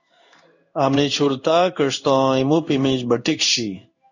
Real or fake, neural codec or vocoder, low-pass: fake; codec, 16 kHz in and 24 kHz out, 1 kbps, XY-Tokenizer; 7.2 kHz